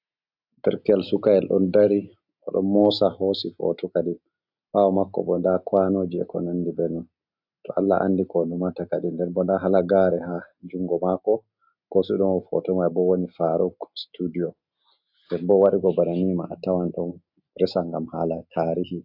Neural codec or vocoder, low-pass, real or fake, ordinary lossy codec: none; 5.4 kHz; real; AAC, 48 kbps